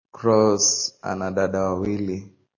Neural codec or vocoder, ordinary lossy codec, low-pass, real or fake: none; MP3, 32 kbps; 7.2 kHz; real